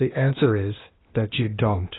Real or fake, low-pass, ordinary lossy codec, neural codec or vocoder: fake; 7.2 kHz; AAC, 16 kbps; codec, 16 kHz, 0.8 kbps, ZipCodec